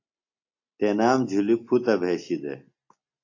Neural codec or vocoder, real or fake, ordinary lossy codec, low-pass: none; real; AAC, 48 kbps; 7.2 kHz